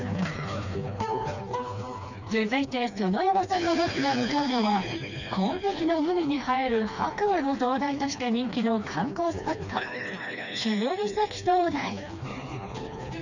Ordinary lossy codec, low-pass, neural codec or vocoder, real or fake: none; 7.2 kHz; codec, 16 kHz, 2 kbps, FreqCodec, smaller model; fake